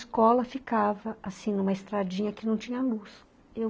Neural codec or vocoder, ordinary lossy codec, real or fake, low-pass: none; none; real; none